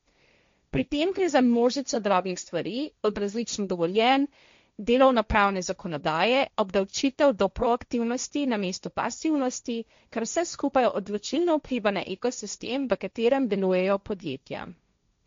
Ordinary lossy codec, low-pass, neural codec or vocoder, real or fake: MP3, 48 kbps; 7.2 kHz; codec, 16 kHz, 1.1 kbps, Voila-Tokenizer; fake